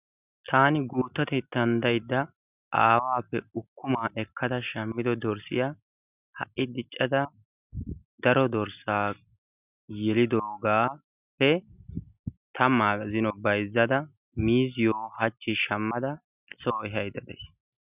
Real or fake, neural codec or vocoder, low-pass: real; none; 3.6 kHz